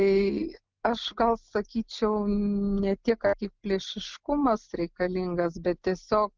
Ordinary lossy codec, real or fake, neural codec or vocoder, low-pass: Opus, 24 kbps; real; none; 7.2 kHz